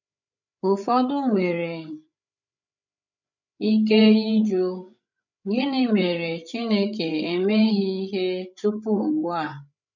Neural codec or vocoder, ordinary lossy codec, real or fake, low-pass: codec, 16 kHz, 8 kbps, FreqCodec, larger model; none; fake; 7.2 kHz